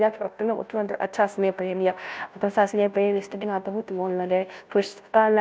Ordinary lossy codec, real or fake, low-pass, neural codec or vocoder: none; fake; none; codec, 16 kHz, 0.5 kbps, FunCodec, trained on Chinese and English, 25 frames a second